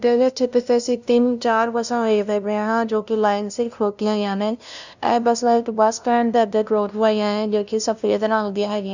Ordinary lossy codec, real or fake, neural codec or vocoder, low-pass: none; fake; codec, 16 kHz, 0.5 kbps, FunCodec, trained on LibriTTS, 25 frames a second; 7.2 kHz